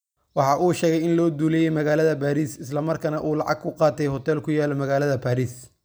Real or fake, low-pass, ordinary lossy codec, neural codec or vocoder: real; none; none; none